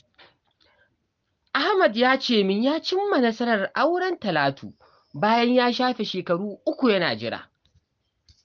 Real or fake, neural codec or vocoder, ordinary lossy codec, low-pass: real; none; Opus, 32 kbps; 7.2 kHz